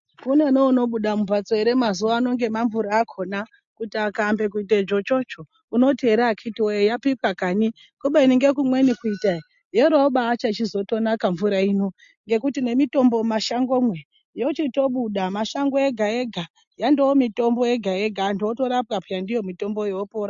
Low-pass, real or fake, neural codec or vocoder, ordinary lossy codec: 7.2 kHz; real; none; MP3, 48 kbps